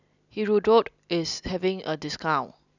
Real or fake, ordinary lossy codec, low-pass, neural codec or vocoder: real; none; 7.2 kHz; none